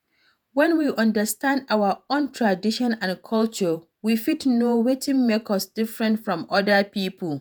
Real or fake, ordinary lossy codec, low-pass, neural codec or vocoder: fake; none; none; vocoder, 48 kHz, 128 mel bands, Vocos